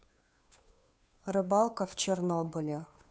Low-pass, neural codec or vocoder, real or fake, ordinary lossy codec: none; codec, 16 kHz, 2 kbps, FunCodec, trained on Chinese and English, 25 frames a second; fake; none